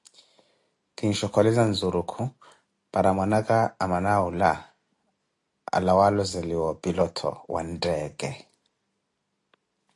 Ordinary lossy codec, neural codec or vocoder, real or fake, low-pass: AAC, 48 kbps; none; real; 10.8 kHz